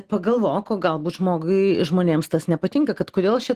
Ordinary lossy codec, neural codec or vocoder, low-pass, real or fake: Opus, 16 kbps; none; 14.4 kHz; real